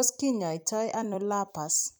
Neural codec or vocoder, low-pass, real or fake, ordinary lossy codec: vocoder, 44.1 kHz, 128 mel bands every 256 samples, BigVGAN v2; none; fake; none